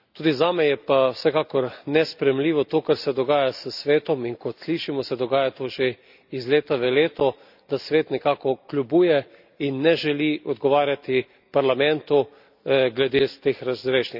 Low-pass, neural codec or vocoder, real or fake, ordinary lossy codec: 5.4 kHz; none; real; none